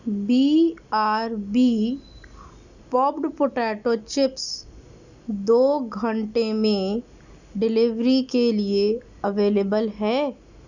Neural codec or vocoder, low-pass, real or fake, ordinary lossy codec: none; 7.2 kHz; real; none